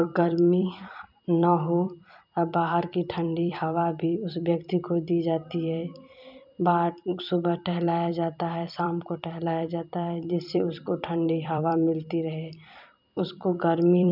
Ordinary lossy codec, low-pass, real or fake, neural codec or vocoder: none; 5.4 kHz; fake; vocoder, 44.1 kHz, 128 mel bands every 256 samples, BigVGAN v2